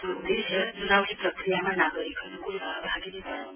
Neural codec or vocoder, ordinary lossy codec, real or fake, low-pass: vocoder, 24 kHz, 100 mel bands, Vocos; none; fake; 3.6 kHz